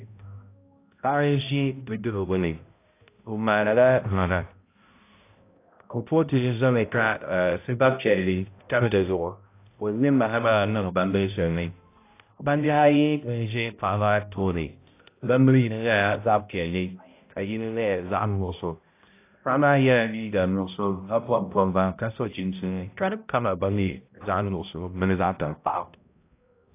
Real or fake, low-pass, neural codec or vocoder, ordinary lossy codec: fake; 3.6 kHz; codec, 16 kHz, 0.5 kbps, X-Codec, HuBERT features, trained on balanced general audio; AAC, 24 kbps